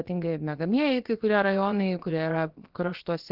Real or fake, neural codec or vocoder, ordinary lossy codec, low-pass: fake; codec, 16 kHz, about 1 kbps, DyCAST, with the encoder's durations; Opus, 16 kbps; 5.4 kHz